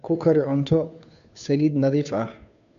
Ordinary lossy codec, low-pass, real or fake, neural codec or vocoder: none; 7.2 kHz; fake; codec, 16 kHz, 2 kbps, FunCodec, trained on Chinese and English, 25 frames a second